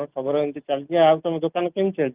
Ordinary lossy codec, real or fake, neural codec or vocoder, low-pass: Opus, 24 kbps; real; none; 3.6 kHz